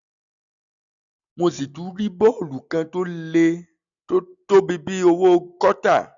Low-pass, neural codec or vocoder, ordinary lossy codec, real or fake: 7.2 kHz; none; none; real